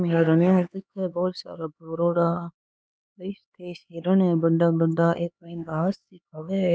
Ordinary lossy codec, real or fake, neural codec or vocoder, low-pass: none; fake; codec, 16 kHz, 4 kbps, X-Codec, HuBERT features, trained on LibriSpeech; none